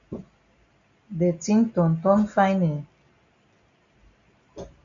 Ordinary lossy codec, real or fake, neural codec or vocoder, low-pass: MP3, 48 kbps; real; none; 7.2 kHz